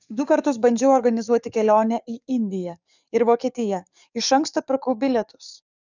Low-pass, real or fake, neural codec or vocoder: 7.2 kHz; fake; codec, 44.1 kHz, 7.8 kbps, DAC